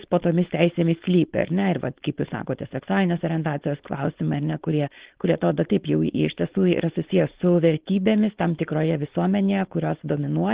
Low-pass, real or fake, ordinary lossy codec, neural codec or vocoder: 3.6 kHz; fake; Opus, 16 kbps; codec, 16 kHz, 4.8 kbps, FACodec